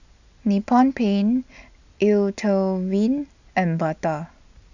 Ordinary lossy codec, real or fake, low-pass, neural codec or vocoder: none; real; 7.2 kHz; none